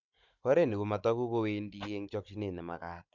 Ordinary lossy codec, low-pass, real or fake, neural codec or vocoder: MP3, 64 kbps; 7.2 kHz; fake; codec, 16 kHz, 16 kbps, FunCodec, trained on Chinese and English, 50 frames a second